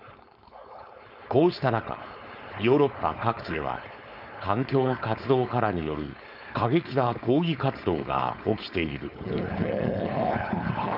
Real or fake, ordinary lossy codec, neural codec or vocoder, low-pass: fake; none; codec, 16 kHz, 4.8 kbps, FACodec; 5.4 kHz